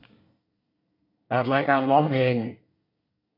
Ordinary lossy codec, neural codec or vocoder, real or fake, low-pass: none; codec, 24 kHz, 1 kbps, SNAC; fake; 5.4 kHz